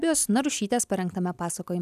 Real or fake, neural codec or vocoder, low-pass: real; none; 14.4 kHz